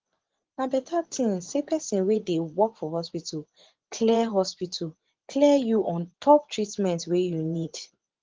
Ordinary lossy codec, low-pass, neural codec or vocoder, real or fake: Opus, 16 kbps; 7.2 kHz; vocoder, 24 kHz, 100 mel bands, Vocos; fake